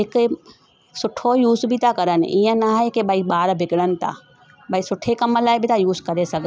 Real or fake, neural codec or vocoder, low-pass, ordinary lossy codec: real; none; none; none